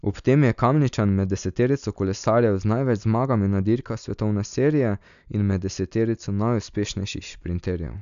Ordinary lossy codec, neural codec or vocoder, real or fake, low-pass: none; none; real; 7.2 kHz